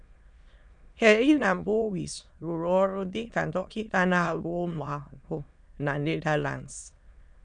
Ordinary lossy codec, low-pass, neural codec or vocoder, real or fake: none; 9.9 kHz; autoencoder, 22.05 kHz, a latent of 192 numbers a frame, VITS, trained on many speakers; fake